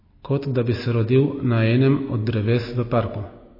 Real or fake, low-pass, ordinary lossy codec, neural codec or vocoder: real; 5.4 kHz; MP3, 24 kbps; none